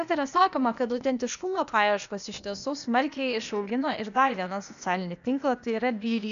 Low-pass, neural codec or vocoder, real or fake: 7.2 kHz; codec, 16 kHz, 0.8 kbps, ZipCodec; fake